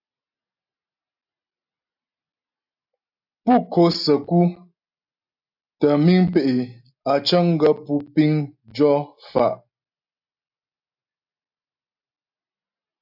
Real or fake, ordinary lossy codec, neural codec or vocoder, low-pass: real; AAC, 48 kbps; none; 5.4 kHz